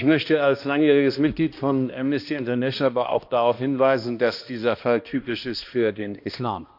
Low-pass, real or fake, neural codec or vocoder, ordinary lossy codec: 5.4 kHz; fake; codec, 16 kHz, 1 kbps, X-Codec, HuBERT features, trained on balanced general audio; MP3, 48 kbps